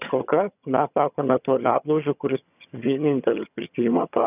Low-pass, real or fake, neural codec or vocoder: 3.6 kHz; fake; vocoder, 22.05 kHz, 80 mel bands, HiFi-GAN